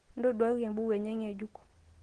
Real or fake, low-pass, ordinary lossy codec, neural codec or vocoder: real; 9.9 kHz; Opus, 16 kbps; none